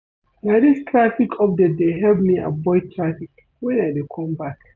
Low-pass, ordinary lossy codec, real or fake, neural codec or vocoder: 7.2 kHz; none; real; none